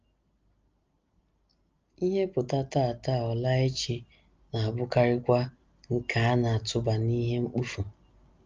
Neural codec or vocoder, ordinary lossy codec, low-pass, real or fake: none; Opus, 24 kbps; 7.2 kHz; real